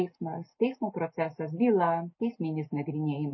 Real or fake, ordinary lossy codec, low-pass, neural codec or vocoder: real; MP3, 24 kbps; 7.2 kHz; none